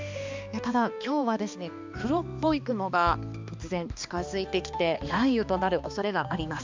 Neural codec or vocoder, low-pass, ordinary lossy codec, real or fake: codec, 16 kHz, 2 kbps, X-Codec, HuBERT features, trained on balanced general audio; 7.2 kHz; MP3, 64 kbps; fake